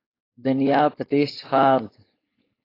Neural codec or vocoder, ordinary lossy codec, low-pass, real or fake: codec, 16 kHz, 4.8 kbps, FACodec; AAC, 24 kbps; 5.4 kHz; fake